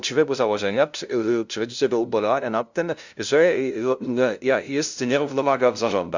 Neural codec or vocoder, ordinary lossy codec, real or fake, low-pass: codec, 16 kHz, 0.5 kbps, FunCodec, trained on LibriTTS, 25 frames a second; Opus, 64 kbps; fake; 7.2 kHz